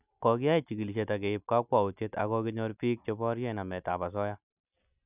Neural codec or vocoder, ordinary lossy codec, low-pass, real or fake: none; none; 3.6 kHz; real